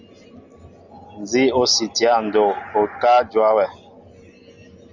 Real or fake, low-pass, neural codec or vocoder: real; 7.2 kHz; none